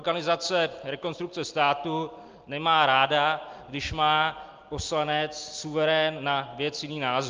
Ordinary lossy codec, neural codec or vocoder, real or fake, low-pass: Opus, 24 kbps; none; real; 7.2 kHz